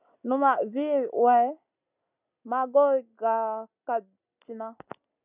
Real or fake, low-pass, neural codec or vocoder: real; 3.6 kHz; none